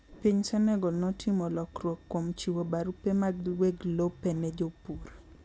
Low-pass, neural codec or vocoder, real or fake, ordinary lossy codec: none; none; real; none